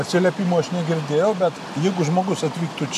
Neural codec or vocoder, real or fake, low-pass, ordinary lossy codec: none; real; 14.4 kHz; AAC, 96 kbps